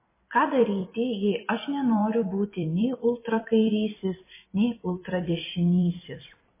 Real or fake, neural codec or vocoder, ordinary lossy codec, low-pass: fake; vocoder, 44.1 kHz, 128 mel bands every 256 samples, BigVGAN v2; MP3, 16 kbps; 3.6 kHz